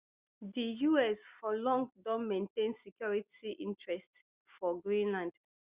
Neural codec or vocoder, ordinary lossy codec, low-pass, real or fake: none; Opus, 64 kbps; 3.6 kHz; real